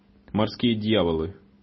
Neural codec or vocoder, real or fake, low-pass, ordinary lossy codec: none; real; 7.2 kHz; MP3, 24 kbps